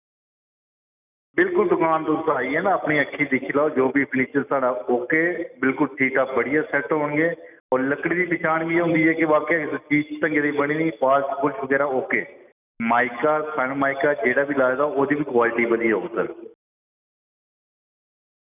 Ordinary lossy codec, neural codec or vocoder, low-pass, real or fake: none; none; 3.6 kHz; real